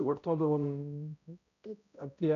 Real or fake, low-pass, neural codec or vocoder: fake; 7.2 kHz; codec, 16 kHz, 0.5 kbps, X-Codec, HuBERT features, trained on balanced general audio